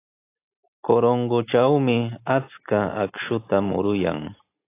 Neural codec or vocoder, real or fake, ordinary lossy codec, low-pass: none; real; AAC, 24 kbps; 3.6 kHz